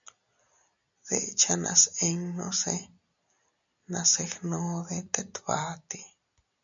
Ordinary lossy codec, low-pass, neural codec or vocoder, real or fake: MP3, 96 kbps; 7.2 kHz; none; real